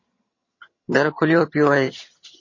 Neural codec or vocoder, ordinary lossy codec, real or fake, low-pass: vocoder, 22.05 kHz, 80 mel bands, HiFi-GAN; MP3, 32 kbps; fake; 7.2 kHz